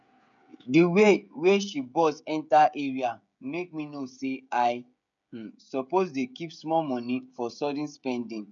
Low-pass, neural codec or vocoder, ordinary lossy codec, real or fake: 7.2 kHz; codec, 16 kHz, 16 kbps, FreqCodec, smaller model; none; fake